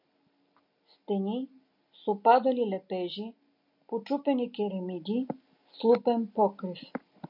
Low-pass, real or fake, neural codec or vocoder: 5.4 kHz; real; none